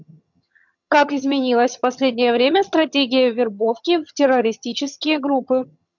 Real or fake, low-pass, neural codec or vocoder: fake; 7.2 kHz; vocoder, 22.05 kHz, 80 mel bands, HiFi-GAN